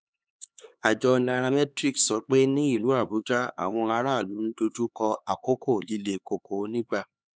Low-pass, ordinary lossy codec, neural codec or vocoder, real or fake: none; none; codec, 16 kHz, 4 kbps, X-Codec, HuBERT features, trained on LibriSpeech; fake